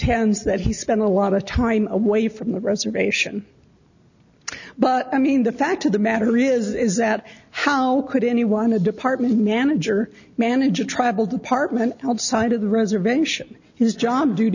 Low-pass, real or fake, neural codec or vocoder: 7.2 kHz; real; none